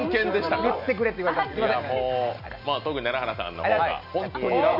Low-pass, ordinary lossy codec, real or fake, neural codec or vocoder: 5.4 kHz; none; real; none